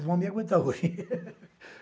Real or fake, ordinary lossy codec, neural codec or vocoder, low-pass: real; none; none; none